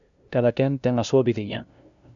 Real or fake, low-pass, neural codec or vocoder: fake; 7.2 kHz; codec, 16 kHz, 0.5 kbps, FunCodec, trained on LibriTTS, 25 frames a second